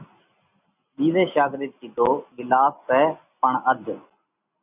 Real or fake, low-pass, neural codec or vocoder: real; 3.6 kHz; none